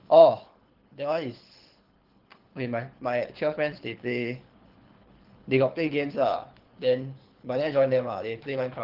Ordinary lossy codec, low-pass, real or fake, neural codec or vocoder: Opus, 16 kbps; 5.4 kHz; fake; codec, 24 kHz, 6 kbps, HILCodec